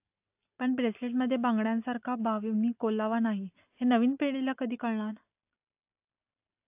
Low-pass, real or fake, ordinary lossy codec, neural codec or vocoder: 3.6 kHz; real; AAC, 32 kbps; none